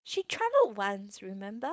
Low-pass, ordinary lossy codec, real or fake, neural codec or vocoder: none; none; fake; codec, 16 kHz, 4.8 kbps, FACodec